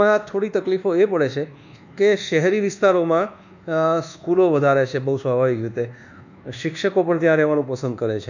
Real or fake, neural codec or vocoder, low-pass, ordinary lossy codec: fake; codec, 24 kHz, 1.2 kbps, DualCodec; 7.2 kHz; none